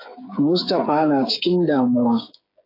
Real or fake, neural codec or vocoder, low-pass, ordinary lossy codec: fake; codec, 16 kHz, 8 kbps, FreqCodec, smaller model; 5.4 kHz; AAC, 32 kbps